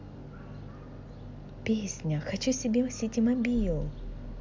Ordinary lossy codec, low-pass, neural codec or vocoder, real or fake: MP3, 64 kbps; 7.2 kHz; none; real